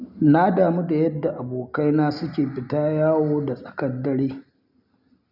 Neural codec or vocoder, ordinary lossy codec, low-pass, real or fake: none; none; 5.4 kHz; real